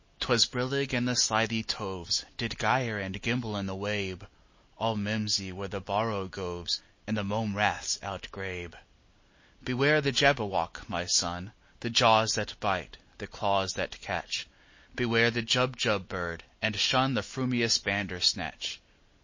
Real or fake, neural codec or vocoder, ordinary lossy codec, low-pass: real; none; MP3, 32 kbps; 7.2 kHz